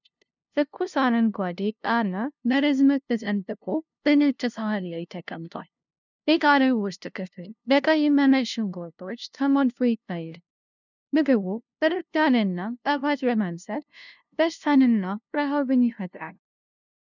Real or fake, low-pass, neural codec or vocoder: fake; 7.2 kHz; codec, 16 kHz, 0.5 kbps, FunCodec, trained on LibriTTS, 25 frames a second